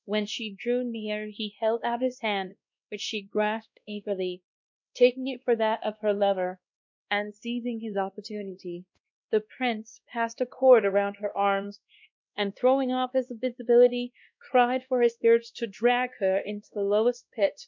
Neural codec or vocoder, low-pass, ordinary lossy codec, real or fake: codec, 16 kHz, 1 kbps, X-Codec, WavLM features, trained on Multilingual LibriSpeech; 7.2 kHz; MP3, 64 kbps; fake